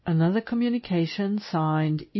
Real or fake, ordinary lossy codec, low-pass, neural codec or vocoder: real; MP3, 24 kbps; 7.2 kHz; none